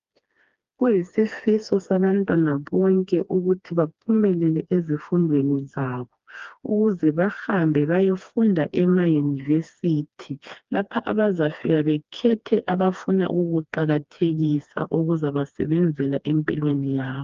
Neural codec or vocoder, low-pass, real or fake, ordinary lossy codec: codec, 16 kHz, 2 kbps, FreqCodec, smaller model; 7.2 kHz; fake; Opus, 32 kbps